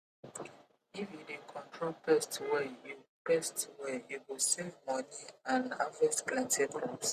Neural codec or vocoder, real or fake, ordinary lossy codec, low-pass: none; real; Opus, 64 kbps; 14.4 kHz